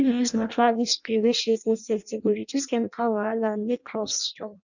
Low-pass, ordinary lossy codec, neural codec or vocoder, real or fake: 7.2 kHz; none; codec, 16 kHz in and 24 kHz out, 0.6 kbps, FireRedTTS-2 codec; fake